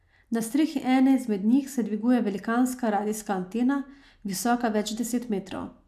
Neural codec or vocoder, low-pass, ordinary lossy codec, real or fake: none; 14.4 kHz; AAC, 96 kbps; real